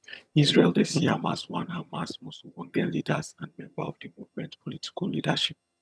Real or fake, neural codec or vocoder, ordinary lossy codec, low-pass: fake; vocoder, 22.05 kHz, 80 mel bands, HiFi-GAN; none; none